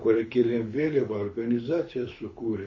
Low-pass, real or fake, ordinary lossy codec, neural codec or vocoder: 7.2 kHz; fake; MP3, 32 kbps; codec, 24 kHz, 6 kbps, HILCodec